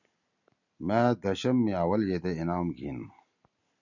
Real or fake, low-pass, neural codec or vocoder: real; 7.2 kHz; none